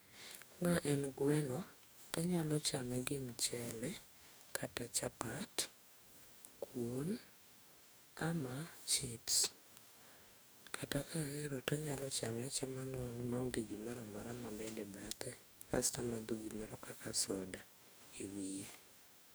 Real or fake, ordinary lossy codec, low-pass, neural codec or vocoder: fake; none; none; codec, 44.1 kHz, 2.6 kbps, DAC